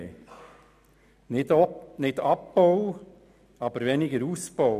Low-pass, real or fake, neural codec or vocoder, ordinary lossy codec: 14.4 kHz; real; none; none